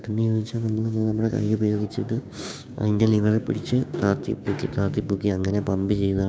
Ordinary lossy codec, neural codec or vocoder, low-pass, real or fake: none; codec, 16 kHz, 6 kbps, DAC; none; fake